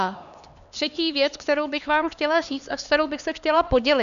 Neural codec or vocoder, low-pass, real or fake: codec, 16 kHz, 2 kbps, X-Codec, HuBERT features, trained on LibriSpeech; 7.2 kHz; fake